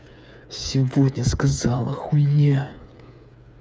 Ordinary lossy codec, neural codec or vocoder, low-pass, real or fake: none; codec, 16 kHz, 8 kbps, FreqCodec, smaller model; none; fake